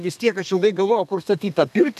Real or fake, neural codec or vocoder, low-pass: fake; codec, 32 kHz, 1.9 kbps, SNAC; 14.4 kHz